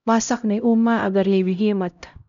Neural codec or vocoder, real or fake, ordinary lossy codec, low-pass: codec, 16 kHz, 0.5 kbps, X-Codec, HuBERT features, trained on LibriSpeech; fake; none; 7.2 kHz